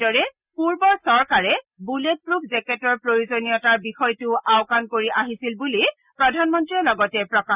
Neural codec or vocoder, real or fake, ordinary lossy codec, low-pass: none; real; Opus, 64 kbps; 3.6 kHz